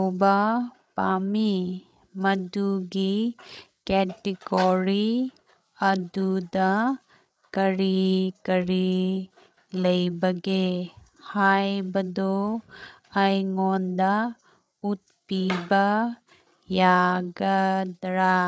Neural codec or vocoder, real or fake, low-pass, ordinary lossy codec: codec, 16 kHz, 8 kbps, FreqCodec, larger model; fake; none; none